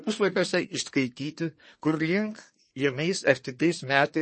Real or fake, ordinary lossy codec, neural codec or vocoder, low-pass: fake; MP3, 32 kbps; codec, 24 kHz, 1 kbps, SNAC; 9.9 kHz